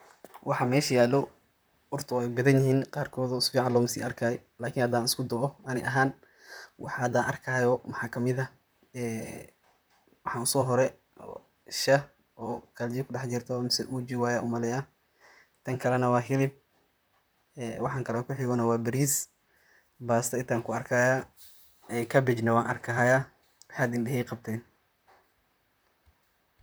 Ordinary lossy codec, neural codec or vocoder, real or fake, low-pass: none; vocoder, 44.1 kHz, 128 mel bands, Pupu-Vocoder; fake; none